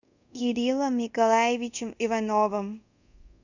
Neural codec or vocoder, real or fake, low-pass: codec, 24 kHz, 0.5 kbps, DualCodec; fake; 7.2 kHz